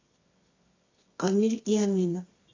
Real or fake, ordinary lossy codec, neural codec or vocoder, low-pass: fake; AAC, 32 kbps; codec, 24 kHz, 0.9 kbps, WavTokenizer, medium music audio release; 7.2 kHz